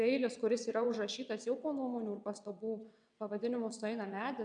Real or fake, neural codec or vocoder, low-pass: fake; vocoder, 22.05 kHz, 80 mel bands, WaveNeXt; 9.9 kHz